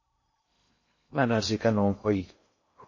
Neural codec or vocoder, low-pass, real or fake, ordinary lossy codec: codec, 16 kHz in and 24 kHz out, 0.6 kbps, FocalCodec, streaming, 2048 codes; 7.2 kHz; fake; MP3, 32 kbps